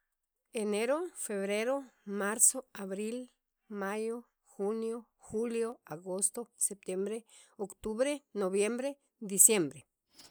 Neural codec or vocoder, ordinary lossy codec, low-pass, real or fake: none; none; none; real